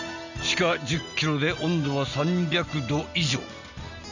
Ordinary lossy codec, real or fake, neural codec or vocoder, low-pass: none; real; none; 7.2 kHz